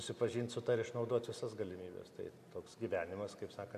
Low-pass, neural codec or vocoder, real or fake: 14.4 kHz; none; real